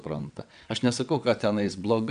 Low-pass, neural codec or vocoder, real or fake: 9.9 kHz; vocoder, 22.05 kHz, 80 mel bands, WaveNeXt; fake